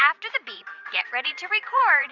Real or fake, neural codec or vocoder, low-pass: fake; vocoder, 44.1 kHz, 80 mel bands, Vocos; 7.2 kHz